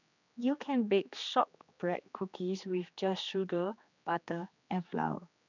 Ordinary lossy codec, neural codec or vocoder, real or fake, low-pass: none; codec, 16 kHz, 2 kbps, X-Codec, HuBERT features, trained on general audio; fake; 7.2 kHz